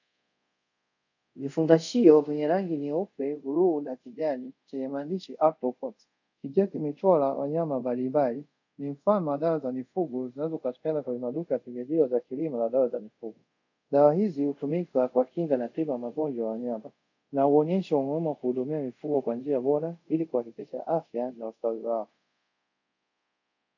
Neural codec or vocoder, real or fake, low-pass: codec, 24 kHz, 0.5 kbps, DualCodec; fake; 7.2 kHz